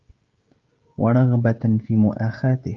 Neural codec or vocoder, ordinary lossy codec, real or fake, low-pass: codec, 16 kHz, 16 kbps, FreqCodec, smaller model; Opus, 32 kbps; fake; 7.2 kHz